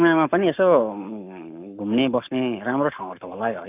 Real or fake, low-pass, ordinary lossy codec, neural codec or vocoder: fake; 3.6 kHz; none; codec, 44.1 kHz, 7.8 kbps, Pupu-Codec